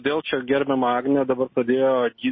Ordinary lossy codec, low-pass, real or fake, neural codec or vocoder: MP3, 24 kbps; 7.2 kHz; real; none